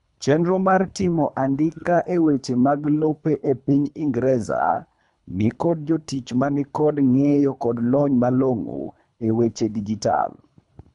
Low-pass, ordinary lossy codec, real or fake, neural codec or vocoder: 10.8 kHz; none; fake; codec, 24 kHz, 3 kbps, HILCodec